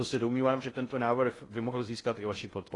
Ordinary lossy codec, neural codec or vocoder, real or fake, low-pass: AAC, 32 kbps; codec, 16 kHz in and 24 kHz out, 0.6 kbps, FocalCodec, streaming, 2048 codes; fake; 10.8 kHz